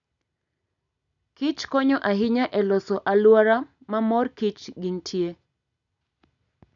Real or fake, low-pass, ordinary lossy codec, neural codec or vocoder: real; 7.2 kHz; none; none